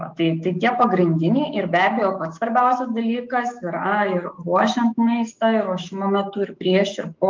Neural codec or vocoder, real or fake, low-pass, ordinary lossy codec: autoencoder, 48 kHz, 128 numbers a frame, DAC-VAE, trained on Japanese speech; fake; 7.2 kHz; Opus, 24 kbps